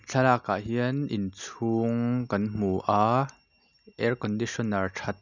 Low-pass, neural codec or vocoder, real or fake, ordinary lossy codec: 7.2 kHz; none; real; none